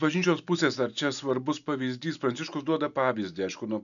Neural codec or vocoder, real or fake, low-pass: none; real; 7.2 kHz